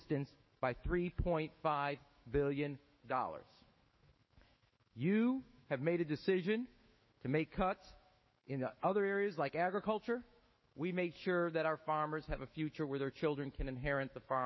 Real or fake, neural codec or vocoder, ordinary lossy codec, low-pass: fake; autoencoder, 48 kHz, 128 numbers a frame, DAC-VAE, trained on Japanese speech; MP3, 24 kbps; 7.2 kHz